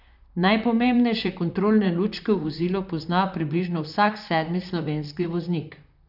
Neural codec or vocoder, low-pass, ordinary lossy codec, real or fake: vocoder, 44.1 kHz, 128 mel bands, Pupu-Vocoder; 5.4 kHz; none; fake